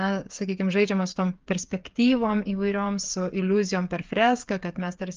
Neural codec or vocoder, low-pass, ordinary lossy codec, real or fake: codec, 16 kHz, 8 kbps, FreqCodec, smaller model; 7.2 kHz; Opus, 24 kbps; fake